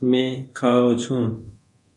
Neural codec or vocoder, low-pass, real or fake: codec, 24 kHz, 0.9 kbps, DualCodec; 10.8 kHz; fake